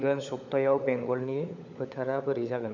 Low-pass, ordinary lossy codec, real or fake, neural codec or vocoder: 7.2 kHz; none; fake; codec, 16 kHz, 16 kbps, FunCodec, trained on LibriTTS, 50 frames a second